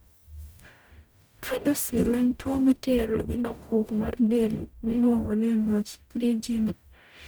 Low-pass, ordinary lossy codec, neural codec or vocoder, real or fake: none; none; codec, 44.1 kHz, 0.9 kbps, DAC; fake